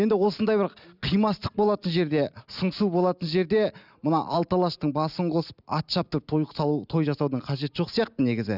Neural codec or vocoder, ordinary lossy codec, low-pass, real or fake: none; none; 5.4 kHz; real